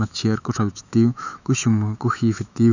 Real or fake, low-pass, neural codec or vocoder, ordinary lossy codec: fake; 7.2 kHz; autoencoder, 48 kHz, 128 numbers a frame, DAC-VAE, trained on Japanese speech; none